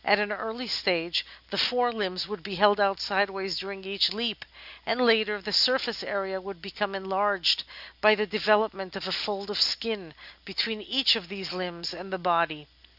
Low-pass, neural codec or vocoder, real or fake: 5.4 kHz; none; real